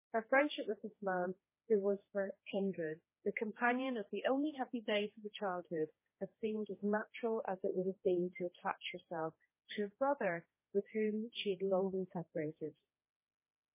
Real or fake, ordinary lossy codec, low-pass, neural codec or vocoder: fake; MP3, 16 kbps; 3.6 kHz; codec, 16 kHz, 2 kbps, X-Codec, HuBERT features, trained on general audio